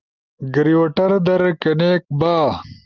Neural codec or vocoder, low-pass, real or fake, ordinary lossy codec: none; 7.2 kHz; real; Opus, 24 kbps